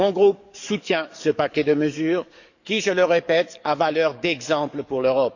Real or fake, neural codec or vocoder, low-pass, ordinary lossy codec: fake; codec, 44.1 kHz, 7.8 kbps, DAC; 7.2 kHz; none